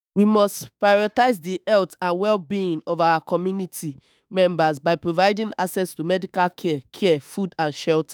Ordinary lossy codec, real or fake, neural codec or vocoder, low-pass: none; fake; autoencoder, 48 kHz, 32 numbers a frame, DAC-VAE, trained on Japanese speech; none